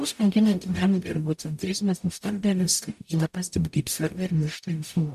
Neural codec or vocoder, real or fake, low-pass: codec, 44.1 kHz, 0.9 kbps, DAC; fake; 14.4 kHz